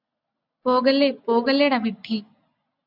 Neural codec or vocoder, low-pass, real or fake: none; 5.4 kHz; real